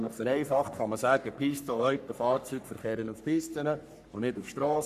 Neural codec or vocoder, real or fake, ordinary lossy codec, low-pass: codec, 44.1 kHz, 3.4 kbps, Pupu-Codec; fake; none; 14.4 kHz